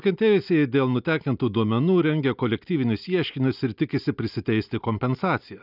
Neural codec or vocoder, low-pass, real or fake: none; 5.4 kHz; real